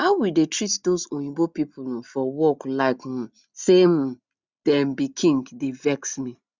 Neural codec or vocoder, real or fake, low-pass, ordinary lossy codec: none; real; 7.2 kHz; Opus, 64 kbps